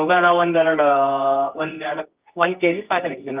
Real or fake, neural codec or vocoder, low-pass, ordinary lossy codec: fake; codec, 24 kHz, 0.9 kbps, WavTokenizer, medium music audio release; 3.6 kHz; Opus, 24 kbps